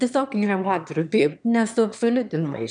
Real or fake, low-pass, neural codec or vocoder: fake; 9.9 kHz; autoencoder, 22.05 kHz, a latent of 192 numbers a frame, VITS, trained on one speaker